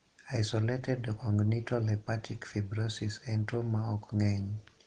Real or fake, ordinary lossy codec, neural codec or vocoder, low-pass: real; Opus, 16 kbps; none; 9.9 kHz